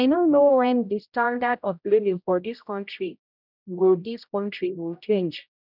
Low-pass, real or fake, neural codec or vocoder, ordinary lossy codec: 5.4 kHz; fake; codec, 16 kHz, 0.5 kbps, X-Codec, HuBERT features, trained on general audio; none